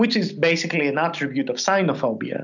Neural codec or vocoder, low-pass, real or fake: none; 7.2 kHz; real